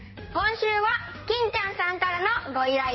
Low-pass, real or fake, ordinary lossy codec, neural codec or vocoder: 7.2 kHz; fake; MP3, 24 kbps; codec, 16 kHz in and 24 kHz out, 2.2 kbps, FireRedTTS-2 codec